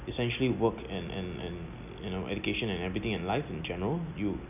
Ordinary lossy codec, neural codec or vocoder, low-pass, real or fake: none; none; 3.6 kHz; real